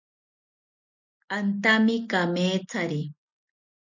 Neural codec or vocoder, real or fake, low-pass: none; real; 7.2 kHz